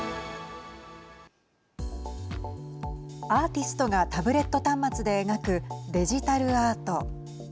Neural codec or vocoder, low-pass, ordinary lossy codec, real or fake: none; none; none; real